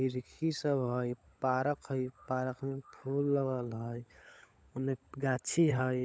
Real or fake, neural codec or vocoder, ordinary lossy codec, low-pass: fake; codec, 16 kHz, 16 kbps, FunCodec, trained on LibriTTS, 50 frames a second; none; none